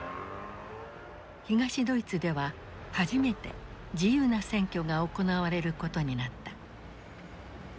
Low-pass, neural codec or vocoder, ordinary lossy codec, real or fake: none; none; none; real